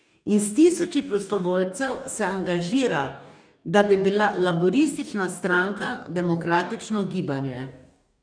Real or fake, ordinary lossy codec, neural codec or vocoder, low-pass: fake; none; codec, 44.1 kHz, 2.6 kbps, DAC; 9.9 kHz